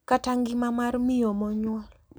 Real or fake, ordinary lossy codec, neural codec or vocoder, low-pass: real; none; none; none